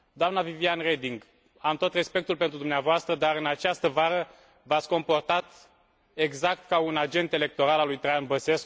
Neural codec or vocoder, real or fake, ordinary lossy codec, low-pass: none; real; none; none